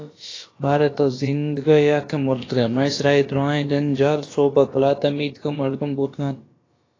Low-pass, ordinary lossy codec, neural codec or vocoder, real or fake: 7.2 kHz; AAC, 32 kbps; codec, 16 kHz, about 1 kbps, DyCAST, with the encoder's durations; fake